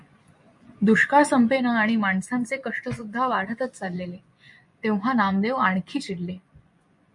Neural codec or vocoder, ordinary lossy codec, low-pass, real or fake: vocoder, 24 kHz, 100 mel bands, Vocos; MP3, 64 kbps; 10.8 kHz; fake